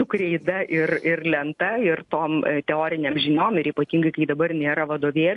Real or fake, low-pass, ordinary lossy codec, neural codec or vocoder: real; 10.8 kHz; AAC, 64 kbps; none